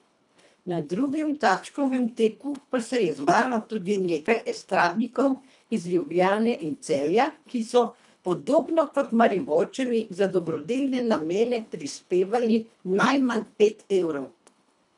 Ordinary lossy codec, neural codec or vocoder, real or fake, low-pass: none; codec, 24 kHz, 1.5 kbps, HILCodec; fake; 10.8 kHz